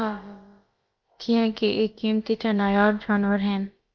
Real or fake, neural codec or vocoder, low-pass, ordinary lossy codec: fake; codec, 16 kHz, about 1 kbps, DyCAST, with the encoder's durations; 7.2 kHz; Opus, 32 kbps